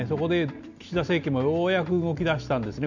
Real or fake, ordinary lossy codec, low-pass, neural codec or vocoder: real; none; 7.2 kHz; none